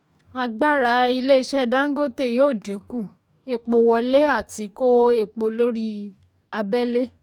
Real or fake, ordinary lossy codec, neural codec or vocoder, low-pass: fake; none; codec, 44.1 kHz, 2.6 kbps, DAC; 19.8 kHz